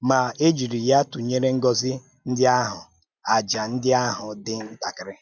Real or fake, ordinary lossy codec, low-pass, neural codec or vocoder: real; none; 7.2 kHz; none